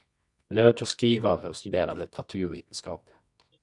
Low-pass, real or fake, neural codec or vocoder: 10.8 kHz; fake; codec, 24 kHz, 0.9 kbps, WavTokenizer, medium music audio release